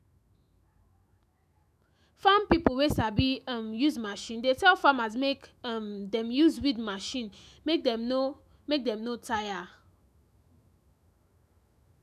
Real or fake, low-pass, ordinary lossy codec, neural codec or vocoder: fake; 14.4 kHz; none; autoencoder, 48 kHz, 128 numbers a frame, DAC-VAE, trained on Japanese speech